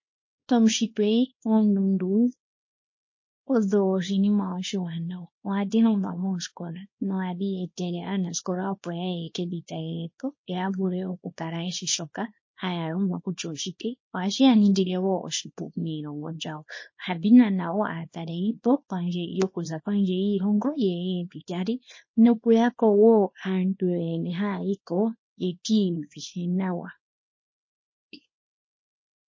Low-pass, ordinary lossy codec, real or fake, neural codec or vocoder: 7.2 kHz; MP3, 32 kbps; fake; codec, 24 kHz, 0.9 kbps, WavTokenizer, small release